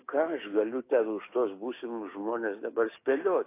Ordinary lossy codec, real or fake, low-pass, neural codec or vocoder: AAC, 24 kbps; fake; 3.6 kHz; codec, 16 kHz, 6 kbps, DAC